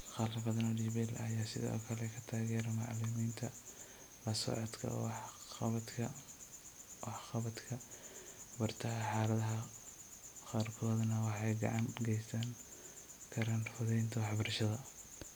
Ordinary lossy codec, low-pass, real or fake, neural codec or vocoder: none; none; real; none